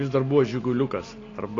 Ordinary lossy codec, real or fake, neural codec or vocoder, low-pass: AAC, 32 kbps; real; none; 7.2 kHz